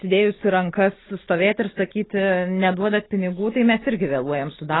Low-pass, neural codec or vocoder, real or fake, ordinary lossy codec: 7.2 kHz; none; real; AAC, 16 kbps